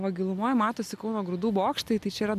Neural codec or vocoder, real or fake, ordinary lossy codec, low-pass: none; real; MP3, 96 kbps; 14.4 kHz